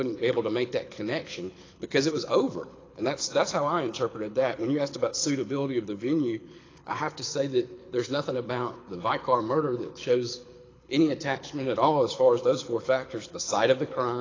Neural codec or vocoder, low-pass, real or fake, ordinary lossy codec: codec, 24 kHz, 6 kbps, HILCodec; 7.2 kHz; fake; AAC, 32 kbps